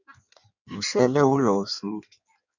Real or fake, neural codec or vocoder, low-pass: fake; codec, 16 kHz in and 24 kHz out, 1.1 kbps, FireRedTTS-2 codec; 7.2 kHz